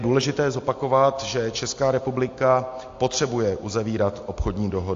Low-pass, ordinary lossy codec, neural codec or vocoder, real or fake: 7.2 kHz; AAC, 48 kbps; none; real